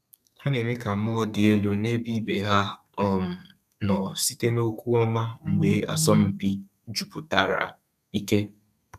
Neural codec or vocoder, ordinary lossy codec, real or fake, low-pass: codec, 32 kHz, 1.9 kbps, SNAC; none; fake; 14.4 kHz